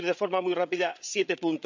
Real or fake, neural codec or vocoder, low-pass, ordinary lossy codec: fake; codec, 16 kHz, 16 kbps, FreqCodec, larger model; 7.2 kHz; MP3, 64 kbps